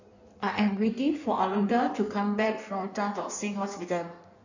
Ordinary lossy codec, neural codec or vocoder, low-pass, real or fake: none; codec, 16 kHz in and 24 kHz out, 1.1 kbps, FireRedTTS-2 codec; 7.2 kHz; fake